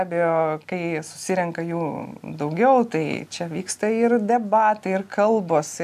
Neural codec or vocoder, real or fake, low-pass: none; real; 14.4 kHz